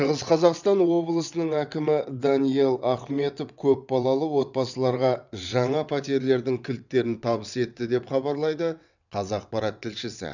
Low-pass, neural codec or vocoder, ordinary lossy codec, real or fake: 7.2 kHz; vocoder, 22.05 kHz, 80 mel bands, WaveNeXt; none; fake